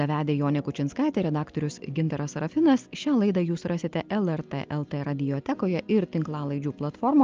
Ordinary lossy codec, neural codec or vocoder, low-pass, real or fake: Opus, 24 kbps; none; 7.2 kHz; real